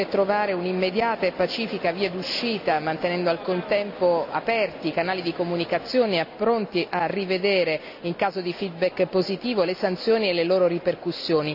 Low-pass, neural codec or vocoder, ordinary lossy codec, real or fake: 5.4 kHz; none; none; real